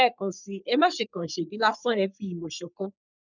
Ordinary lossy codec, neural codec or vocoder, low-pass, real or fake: none; codec, 44.1 kHz, 3.4 kbps, Pupu-Codec; 7.2 kHz; fake